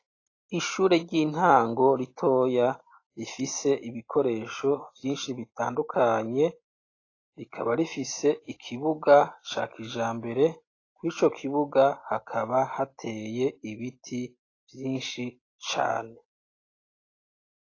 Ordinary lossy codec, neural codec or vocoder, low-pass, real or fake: AAC, 32 kbps; none; 7.2 kHz; real